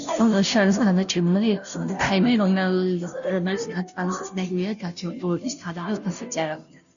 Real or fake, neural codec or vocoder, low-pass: fake; codec, 16 kHz, 0.5 kbps, FunCodec, trained on Chinese and English, 25 frames a second; 7.2 kHz